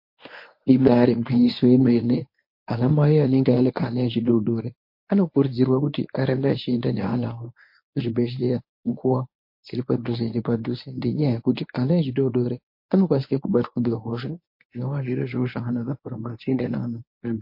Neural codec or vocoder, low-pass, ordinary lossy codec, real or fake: codec, 24 kHz, 0.9 kbps, WavTokenizer, medium speech release version 1; 5.4 kHz; MP3, 32 kbps; fake